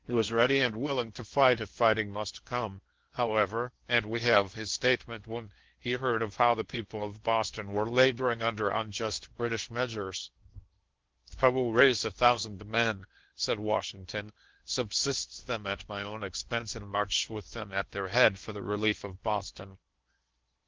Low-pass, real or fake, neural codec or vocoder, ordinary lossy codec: 7.2 kHz; fake; codec, 16 kHz in and 24 kHz out, 0.8 kbps, FocalCodec, streaming, 65536 codes; Opus, 16 kbps